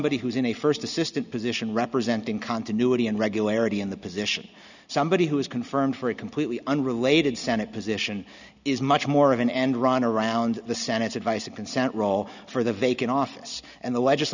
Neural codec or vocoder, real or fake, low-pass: none; real; 7.2 kHz